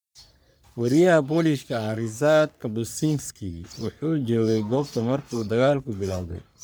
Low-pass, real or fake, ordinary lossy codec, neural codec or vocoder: none; fake; none; codec, 44.1 kHz, 3.4 kbps, Pupu-Codec